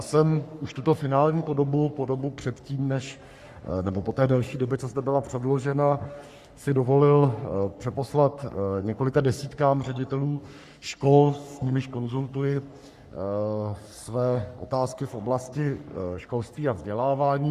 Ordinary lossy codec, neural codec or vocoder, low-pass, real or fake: Opus, 64 kbps; codec, 44.1 kHz, 3.4 kbps, Pupu-Codec; 14.4 kHz; fake